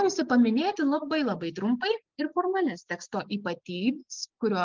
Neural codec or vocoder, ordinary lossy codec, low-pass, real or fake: none; Opus, 24 kbps; 7.2 kHz; real